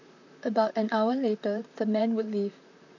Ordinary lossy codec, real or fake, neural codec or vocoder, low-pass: none; fake; vocoder, 44.1 kHz, 128 mel bands, Pupu-Vocoder; 7.2 kHz